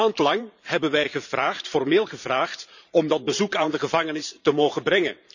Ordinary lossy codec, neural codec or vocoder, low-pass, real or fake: none; vocoder, 44.1 kHz, 128 mel bands every 512 samples, BigVGAN v2; 7.2 kHz; fake